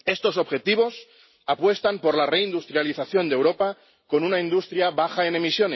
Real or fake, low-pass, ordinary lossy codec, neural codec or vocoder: real; 7.2 kHz; MP3, 24 kbps; none